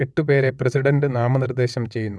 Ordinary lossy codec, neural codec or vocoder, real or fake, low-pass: none; vocoder, 22.05 kHz, 80 mel bands, WaveNeXt; fake; none